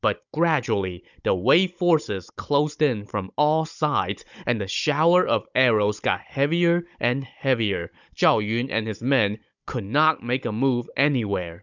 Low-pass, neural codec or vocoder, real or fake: 7.2 kHz; codec, 16 kHz, 16 kbps, FunCodec, trained on Chinese and English, 50 frames a second; fake